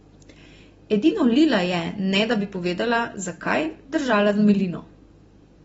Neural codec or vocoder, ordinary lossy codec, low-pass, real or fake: none; AAC, 24 kbps; 19.8 kHz; real